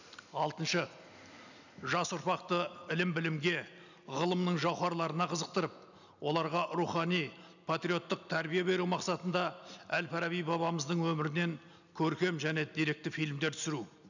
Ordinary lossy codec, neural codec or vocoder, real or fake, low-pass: none; none; real; 7.2 kHz